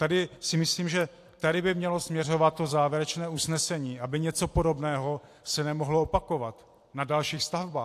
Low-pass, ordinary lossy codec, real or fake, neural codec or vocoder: 14.4 kHz; AAC, 64 kbps; fake; vocoder, 44.1 kHz, 128 mel bands every 256 samples, BigVGAN v2